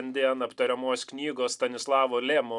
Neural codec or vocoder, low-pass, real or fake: none; 10.8 kHz; real